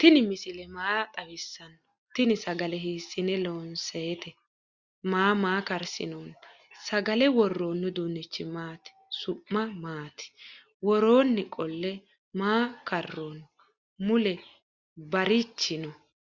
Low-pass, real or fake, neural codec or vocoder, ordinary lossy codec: 7.2 kHz; real; none; Opus, 64 kbps